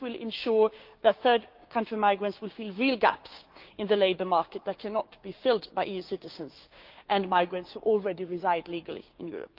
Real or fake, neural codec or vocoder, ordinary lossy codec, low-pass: fake; codec, 16 kHz, 6 kbps, DAC; Opus, 32 kbps; 5.4 kHz